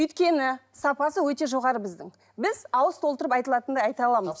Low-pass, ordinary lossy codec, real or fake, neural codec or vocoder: none; none; real; none